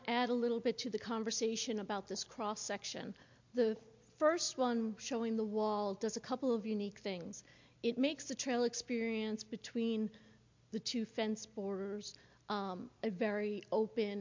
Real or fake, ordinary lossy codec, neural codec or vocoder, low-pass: real; MP3, 48 kbps; none; 7.2 kHz